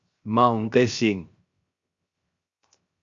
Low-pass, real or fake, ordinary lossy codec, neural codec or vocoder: 7.2 kHz; fake; Opus, 64 kbps; codec, 16 kHz, 0.7 kbps, FocalCodec